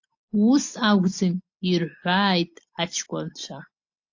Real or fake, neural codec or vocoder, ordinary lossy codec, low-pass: real; none; AAC, 48 kbps; 7.2 kHz